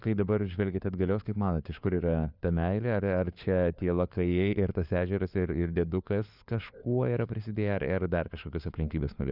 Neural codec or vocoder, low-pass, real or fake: codec, 16 kHz, 4 kbps, FunCodec, trained on LibriTTS, 50 frames a second; 5.4 kHz; fake